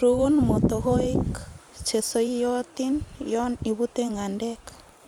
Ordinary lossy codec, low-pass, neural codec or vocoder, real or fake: none; 19.8 kHz; vocoder, 44.1 kHz, 128 mel bands every 512 samples, BigVGAN v2; fake